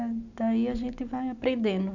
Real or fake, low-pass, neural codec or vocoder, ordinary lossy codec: real; 7.2 kHz; none; none